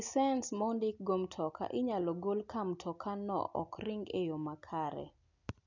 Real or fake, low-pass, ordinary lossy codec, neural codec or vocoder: real; 7.2 kHz; none; none